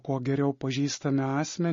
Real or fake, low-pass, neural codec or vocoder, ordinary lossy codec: real; 7.2 kHz; none; MP3, 32 kbps